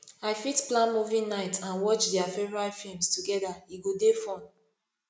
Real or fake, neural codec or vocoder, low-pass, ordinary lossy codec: real; none; none; none